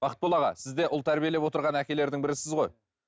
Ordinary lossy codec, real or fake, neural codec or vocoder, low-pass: none; real; none; none